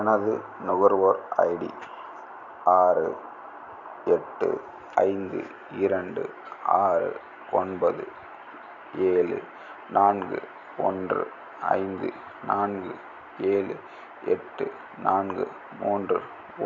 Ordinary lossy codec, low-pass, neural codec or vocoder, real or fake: none; 7.2 kHz; none; real